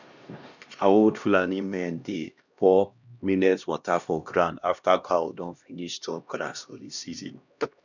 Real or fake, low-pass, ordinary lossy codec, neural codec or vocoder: fake; 7.2 kHz; none; codec, 16 kHz, 1 kbps, X-Codec, HuBERT features, trained on LibriSpeech